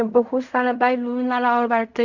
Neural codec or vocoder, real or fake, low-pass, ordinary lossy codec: codec, 16 kHz in and 24 kHz out, 0.4 kbps, LongCat-Audio-Codec, fine tuned four codebook decoder; fake; 7.2 kHz; none